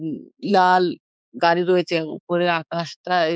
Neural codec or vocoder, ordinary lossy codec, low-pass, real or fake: codec, 16 kHz, 4 kbps, X-Codec, HuBERT features, trained on balanced general audio; none; none; fake